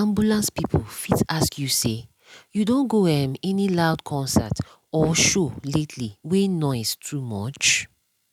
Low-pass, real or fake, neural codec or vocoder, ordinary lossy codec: 19.8 kHz; real; none; none